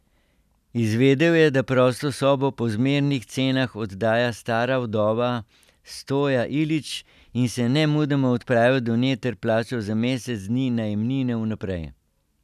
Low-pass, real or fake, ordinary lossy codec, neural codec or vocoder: 14.4 kHz; real; none; none